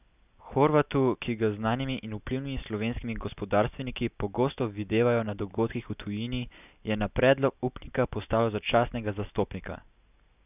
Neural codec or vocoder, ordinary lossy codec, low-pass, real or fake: none; none; 3.6 kHz; real